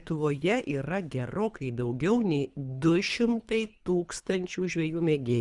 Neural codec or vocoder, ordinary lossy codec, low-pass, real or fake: codec, 24 kHz, 3 kbps, HILCodec; Opus, 64 kbps; 10.8 kHz; fake